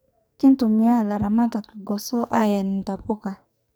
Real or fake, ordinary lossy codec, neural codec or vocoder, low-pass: fake; none; codec, 44.1 kHz, 2.6 kbps, SNAC; none